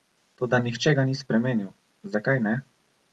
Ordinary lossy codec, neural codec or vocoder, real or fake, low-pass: Opus, 32 kbps; none; real; 19.8 kHz